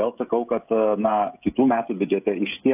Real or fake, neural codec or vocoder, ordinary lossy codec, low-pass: fake; codec, 16 kHz, 16 kbps, FreqCodec, smaller model; AAC, 32 kbps; 3.6 kHz